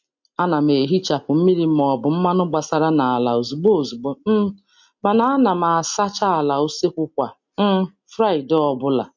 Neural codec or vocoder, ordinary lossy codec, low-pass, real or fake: none; MP3, 48 kbps; 7.2 kHz; real